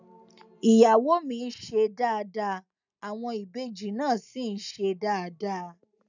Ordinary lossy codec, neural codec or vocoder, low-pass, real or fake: none; none; 7.2 kHz; real